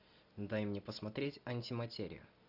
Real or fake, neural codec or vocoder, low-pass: real; none; 5.4 kHz